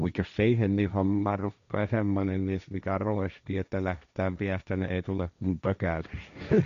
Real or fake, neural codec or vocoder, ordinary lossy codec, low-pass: fake; codec, 16 kHz, 1.1 kbps, Voila-Tokenizer; none; 7.2 kHz